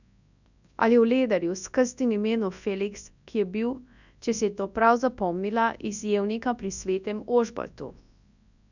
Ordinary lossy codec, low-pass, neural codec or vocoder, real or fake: none; 7.2 kHz; codec, 24 kHz, 0.9 kbps, WavTokenizer, large speech release; fake